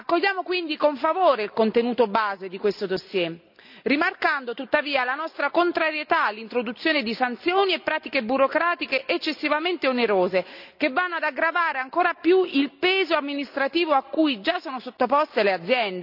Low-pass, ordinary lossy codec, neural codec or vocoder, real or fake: 5.4 kHz; none; none; real